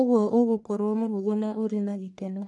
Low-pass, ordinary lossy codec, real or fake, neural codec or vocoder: 10.8 kHz; none; fake; codec, 44.1 kHz, 1.7 kbps, Pupu-Codec